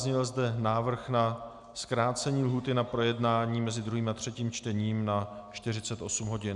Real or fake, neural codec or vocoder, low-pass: real; none; 10.8 kHz